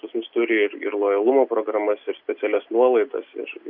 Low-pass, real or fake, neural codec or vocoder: 5.4 kHz; real; none